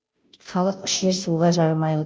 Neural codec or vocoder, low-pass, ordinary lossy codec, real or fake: codec, 16 kHz, 0.5 kbps, FunCodec, trained on Chinese and English, 25 frames a second; none; none; fake